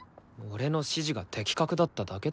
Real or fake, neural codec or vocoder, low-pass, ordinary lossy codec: real; none; none; none